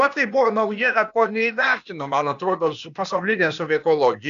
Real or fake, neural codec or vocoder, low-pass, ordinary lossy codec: fake; codec, 16 kHz, 0.8 kbps, ZipCodec; 7.2 kHz; AAC, 96 kbps